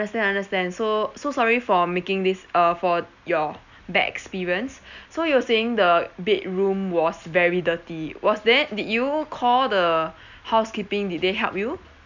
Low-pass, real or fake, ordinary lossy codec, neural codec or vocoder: 7.2 kHz; real; none; none